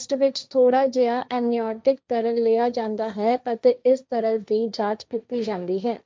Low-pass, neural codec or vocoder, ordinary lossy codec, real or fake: none; codec, 16 kHz, 1.1 kbps, Voila-Tokenizer; none; fake